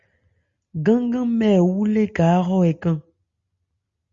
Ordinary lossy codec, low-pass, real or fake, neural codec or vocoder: Opus, 64 kbps; 7.2 kHz; real; none